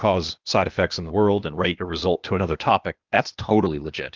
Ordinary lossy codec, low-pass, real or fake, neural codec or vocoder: Opus, 24 kbps; 7.2 kHz; fake; codec, 16 kHz, 0.8 kbps, ZipCodec